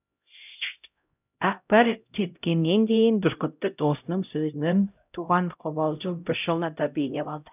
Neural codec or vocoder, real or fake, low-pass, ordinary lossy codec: codec, 16 kHz, 0.5 kbps, X-Codec, HuBERT features, trained on LibriSpeech; fake; 3.6 kHz; none